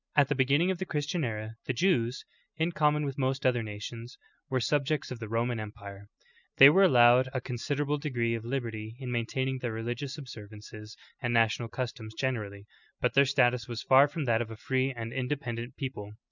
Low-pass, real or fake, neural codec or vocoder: 7.2 kHz; real; none